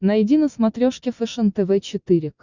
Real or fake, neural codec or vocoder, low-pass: real; none; 7.2 kHz